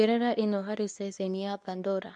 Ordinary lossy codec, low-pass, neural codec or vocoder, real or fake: none; 10.8 kHz; codec, 24 kHz, 0.9 kbps, WavTokenizer, medium speech release version 2; fake